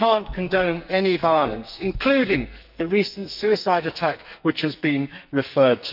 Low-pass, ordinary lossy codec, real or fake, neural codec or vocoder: 5.4 kHz; none; fake; codec, 44.1 kHz, 2.6 kbps, SNAC